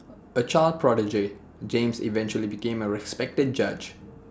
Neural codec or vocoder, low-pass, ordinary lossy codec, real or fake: none; none; none; real